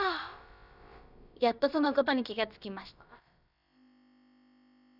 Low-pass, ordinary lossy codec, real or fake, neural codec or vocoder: 5.4 kHz; none; fake; codec, 16 kHz, about 1 kbps, DyCAST, with the encoder's durations